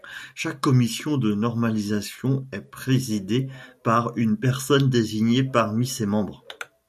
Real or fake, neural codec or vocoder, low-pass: real; none; 14.4 kHz